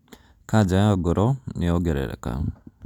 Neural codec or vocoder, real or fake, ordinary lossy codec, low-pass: none; real; none; 19.8 kHz